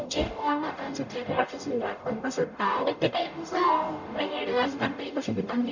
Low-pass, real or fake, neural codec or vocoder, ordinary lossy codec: 7.2 kHz; fake; codec, 44.1 kHz, 0.9 kbps, DAC; none